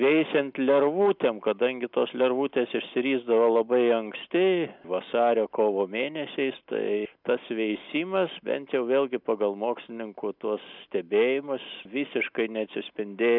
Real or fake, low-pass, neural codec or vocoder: real; 5.4 kHz; none